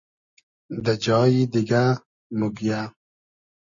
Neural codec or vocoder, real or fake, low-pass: none; real; 7.2 kHz